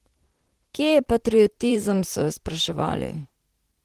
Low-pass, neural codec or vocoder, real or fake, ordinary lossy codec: 14.4 kHz; vocoder, 44.1 kHz, 128 mel bands, Pupu-Vocoder; fake; Opus, 16 kbps